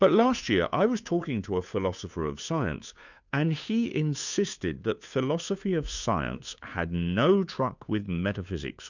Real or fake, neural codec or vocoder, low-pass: fake; codec, 16 kHz, 2 kbps, FunCodec, trained on Chinese and English, 25 frames a second; 7.2 kHz